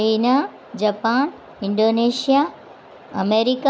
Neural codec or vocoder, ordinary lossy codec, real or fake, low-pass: none; none; real; none